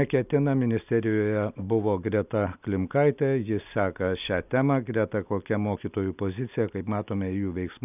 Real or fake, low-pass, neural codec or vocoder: real; 3.6 kHz; none